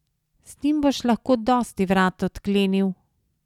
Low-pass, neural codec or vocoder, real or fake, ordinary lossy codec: 19.8 kHz; none; real; none